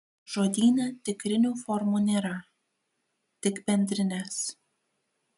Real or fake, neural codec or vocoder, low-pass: real; none; 10.8 kHz